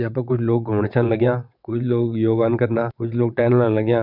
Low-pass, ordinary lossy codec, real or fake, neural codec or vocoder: 5.4 kHz; none; fake; vocoder, 22.05 kHz, 80 mel bands, WaveNeXt